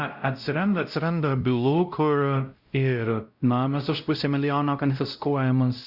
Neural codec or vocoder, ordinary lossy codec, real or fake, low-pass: codec, 16 kHz, 0.5 kbps, X-Codec, WavLM features, trained on Multilingual LibriSpeech; Opus, 64 kbps; fake; 5.4 kHz